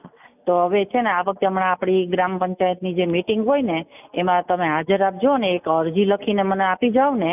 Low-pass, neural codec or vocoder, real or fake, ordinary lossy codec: 3.6 kHz; none; real; none